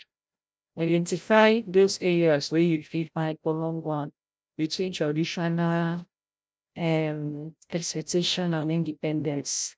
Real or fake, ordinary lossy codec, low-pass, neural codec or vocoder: fake; none; none; codec, 16 kHz, 0.5 kbps, FreqCodec, larger model